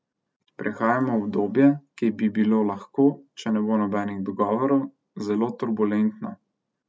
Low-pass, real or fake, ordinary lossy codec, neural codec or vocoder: none; real; none; none